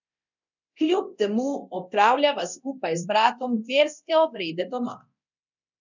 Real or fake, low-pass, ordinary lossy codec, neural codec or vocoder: fake; 7.2 kHz; none; codec, 24 kHz, 0.9 kbps, DualCodec